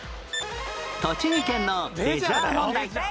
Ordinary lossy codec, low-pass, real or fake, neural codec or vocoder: none; none; real; none